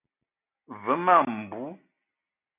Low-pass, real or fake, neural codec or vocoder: 3.6 kHz; real; none